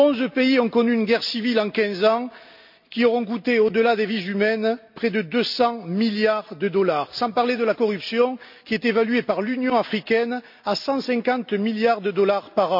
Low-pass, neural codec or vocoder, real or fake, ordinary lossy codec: 5.4 kHz; none; real; MP3, 48 kbps